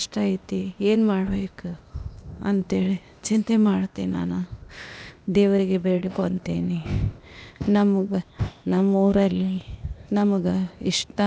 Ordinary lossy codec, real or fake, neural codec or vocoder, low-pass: none; fake; codec, 16 kHz, 0.8 kbps, ZipCodec; none